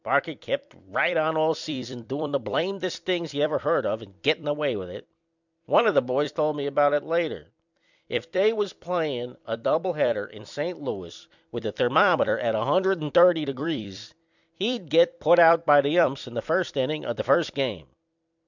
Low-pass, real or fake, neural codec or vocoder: 7.2 kHz; fake; vocoder, 44.1 kHz, 128 mel bands every 256 samples, BigVGAN v2